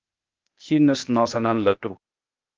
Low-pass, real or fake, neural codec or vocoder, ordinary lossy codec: 7.2 kHz; fake; codec, 16 kHz, 0.8 kbps, ZipCodec; Opus, 24 kbps